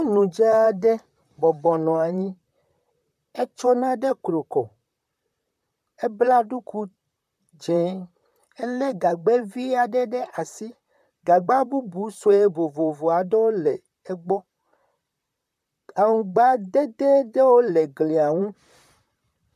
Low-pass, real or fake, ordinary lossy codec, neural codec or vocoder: 14.4 kHz; fake; MP3, 96 kbps; vocoder, 44.1 kHz, 128 mel bands, Pupu-Vocoder